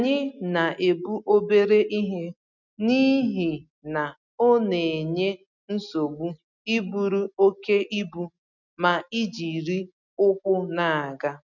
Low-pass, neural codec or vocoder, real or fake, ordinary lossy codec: 7.2 kHz; none; real; none